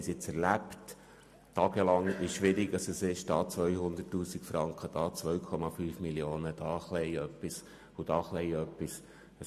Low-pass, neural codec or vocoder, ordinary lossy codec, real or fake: 14.4 kHz; none; MP3, 64 kbps; real